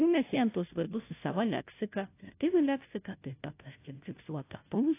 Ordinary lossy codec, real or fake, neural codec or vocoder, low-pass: AAC, 24 kbps; fake; codec, 16 kHz, 0.5 kbps, FunCodec, trained on Chinese and English, 25 frames a second; 3.6 kHz